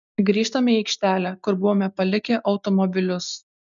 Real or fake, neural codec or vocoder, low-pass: real; none; 7.2 kHz